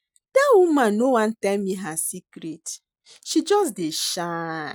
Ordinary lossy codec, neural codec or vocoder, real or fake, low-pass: none; none; real; none